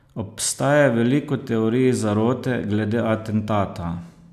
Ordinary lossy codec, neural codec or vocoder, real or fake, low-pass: none; none; real; 14.4 kHz